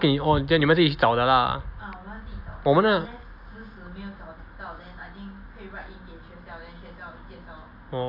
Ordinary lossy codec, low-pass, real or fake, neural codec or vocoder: AAC, 48 kbps; 5.4 kHz; real; none